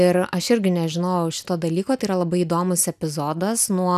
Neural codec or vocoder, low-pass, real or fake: none; 14.4 kHz; real